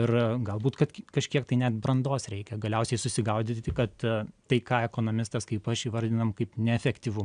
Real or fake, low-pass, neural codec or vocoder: real; 9.9 kHz; none